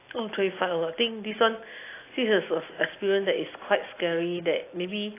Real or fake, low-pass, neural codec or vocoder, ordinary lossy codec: real; 3.6 kHz; none; AAC, 24 kbps